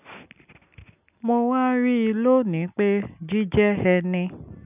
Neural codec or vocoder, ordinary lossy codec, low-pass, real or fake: none; none; 3.6 kHz; real